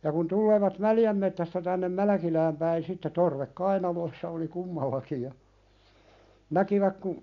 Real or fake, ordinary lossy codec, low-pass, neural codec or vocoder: real; none; 7.2 kHz; none